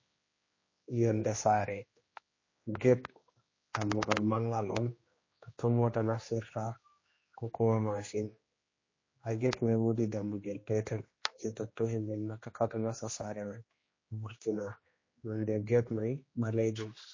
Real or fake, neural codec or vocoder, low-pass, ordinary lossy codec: fake; codec, 16 kHz, 1 kbps, X-Codec, HuBERT features, trained on general audio; 7.2 kHz; MP3, 32 kbps